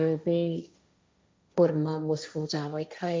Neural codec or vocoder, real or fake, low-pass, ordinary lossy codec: codec, 16 kHz, 1.1 kbps, Voila-Tokenizer; fake; none; none